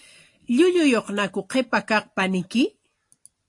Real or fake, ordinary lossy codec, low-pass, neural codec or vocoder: real; AAC, 48 kbps; 10.8 kHz; none